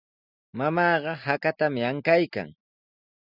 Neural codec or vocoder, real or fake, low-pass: none; real; 5.4 kHz